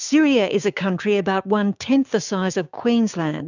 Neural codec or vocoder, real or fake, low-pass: codec, 16 kHz, 6 kbps, DAC; fake; 7.2 kHz